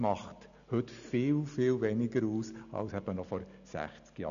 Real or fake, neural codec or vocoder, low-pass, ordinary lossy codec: real; none; 7.2 kHz; none